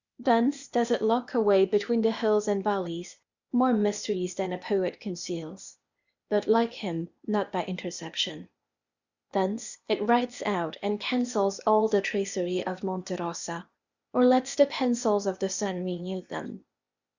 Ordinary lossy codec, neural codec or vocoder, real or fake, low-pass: Opus, 64 kbps; codec, 16 kHz, 0.8 kbps, ZipCodec; fake; 7.2 kHz